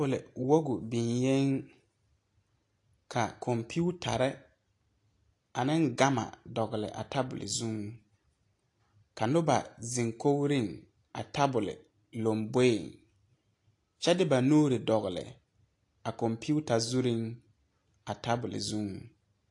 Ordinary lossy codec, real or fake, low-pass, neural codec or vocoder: AAC, 48 kbps; real; 10.8 kHz; none